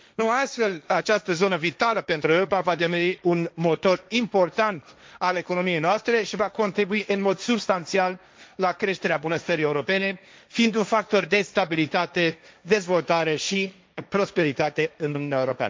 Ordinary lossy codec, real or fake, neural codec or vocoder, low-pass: none; fake; codec, 16 kHz, 1.1 kbps, Voila-Tokenizer; none